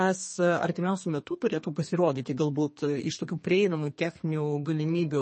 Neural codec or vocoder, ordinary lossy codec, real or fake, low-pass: codec, 32 kHz, 1.9 kbps, SNAC; MP3, 32 kbps; fake; 9.9 kHz